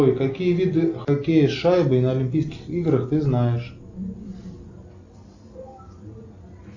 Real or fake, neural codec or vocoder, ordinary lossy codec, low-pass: real; none; AAC, 48 kbps; 7.2 kHz